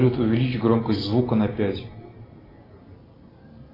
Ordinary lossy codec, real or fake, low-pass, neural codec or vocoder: AAC, 24 kbps; real; 5.4 kHz; none